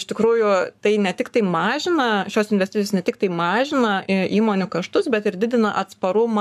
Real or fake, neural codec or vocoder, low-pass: fake; codec, 44.1 kHz, 7.8 kbps, Pupu-Codec; 14.4 kHz